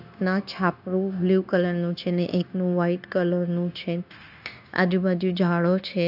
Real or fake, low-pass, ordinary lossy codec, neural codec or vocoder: fake; 5.4 kHz; AAC, 48 kbps; codec, 16 kHz, 0.9 kbps, LongCat-Audio-Codec